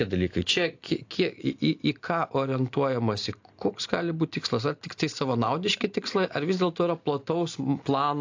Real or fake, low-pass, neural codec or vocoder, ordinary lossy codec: real; 7.2 kHz; none; AAC, 48 kbps